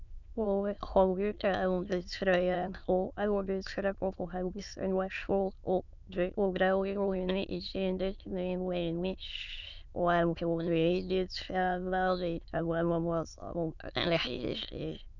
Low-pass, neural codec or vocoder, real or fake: 7.2 kHz; autoencoder, 22.05 kHz, a latent of 192 numbers a frame, VITS, trained on many speakers; fake